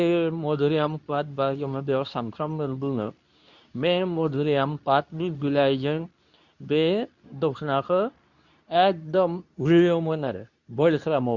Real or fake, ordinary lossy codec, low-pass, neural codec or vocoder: fake; none; 7.2 kHz; codec, 24 kHz, 0.9 kbps, WavTokenizer, medium speech release version 2